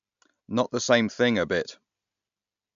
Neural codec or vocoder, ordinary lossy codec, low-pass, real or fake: none; MP3, 64 kbps; 7.2 kHz; real